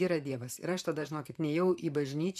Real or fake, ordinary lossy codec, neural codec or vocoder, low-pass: fake; MP3, 96 kbps; vocoder, 44.1 kHz, 128 mel bands, Pupu-Vocoder; 14.4 kHz